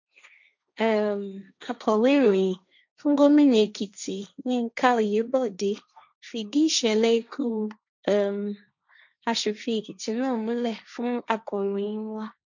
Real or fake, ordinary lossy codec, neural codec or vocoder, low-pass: fake; none; codec, 16 kHz, 1.1 kbps, Voila-Tokenizer; 7.2 kHz